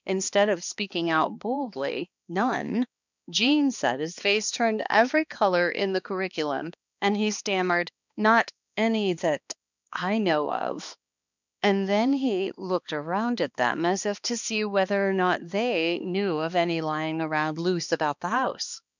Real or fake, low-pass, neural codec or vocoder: fake; 7.2 kHz; codec, 16 kHz, 2 kbps, X-Codec, HuBERT features, trained on balanced general audio